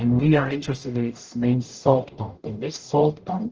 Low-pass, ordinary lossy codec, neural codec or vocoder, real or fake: 7.2 kHz; Opus, 16 kbps; codec, 44.1 kHz, 0.9 kbps, DAC; fake